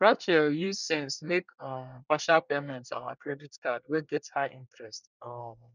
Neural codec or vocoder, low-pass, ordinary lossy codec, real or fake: codec, 24 kHz, 1 kbps, SNAC; 7.2 kHz; none; fake